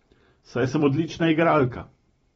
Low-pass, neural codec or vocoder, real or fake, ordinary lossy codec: 19.8 kHz; vocoder, 44.1 kHz, 128 mel bands every 256 samples, BigVGAN v2; fake; AAC, 24 kbps